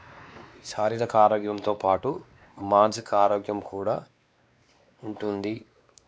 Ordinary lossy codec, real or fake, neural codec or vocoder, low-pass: none; fake; codec, 16 kHz, 2 kbps, X-Codec, WavLM features, trained on Multilingual LibriSpeech; none